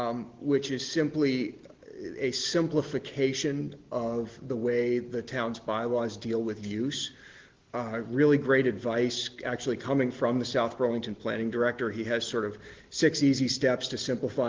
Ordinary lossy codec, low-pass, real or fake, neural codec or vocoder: Opus, 16 kbps; 7.2 kHz; real; none